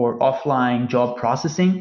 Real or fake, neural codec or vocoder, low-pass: real; none; 7.2 kHz